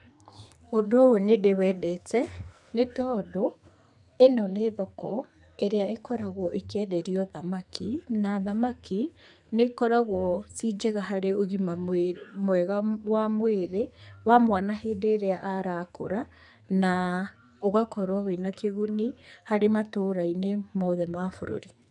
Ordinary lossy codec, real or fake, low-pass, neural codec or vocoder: none; fake; 10.8 kHz; codec, 44.1 kHz, 2.6 kbps, SNAC